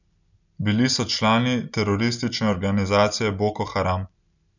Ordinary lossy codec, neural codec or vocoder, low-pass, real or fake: none; none; 7.2 kHz; real